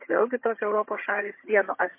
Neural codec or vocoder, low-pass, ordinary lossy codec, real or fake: vocoder, 22.05 kHz, 80 mel bands, HiFi-GAN; 3.6 kHz; MP3, 24 kbps; fake